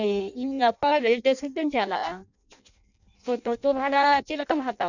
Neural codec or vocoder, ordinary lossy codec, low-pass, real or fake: codec, 16 kHz in and 24 kHz out, 0.6 kbps, FireRedTTS-2 codec; none; 7.2 kHz; fake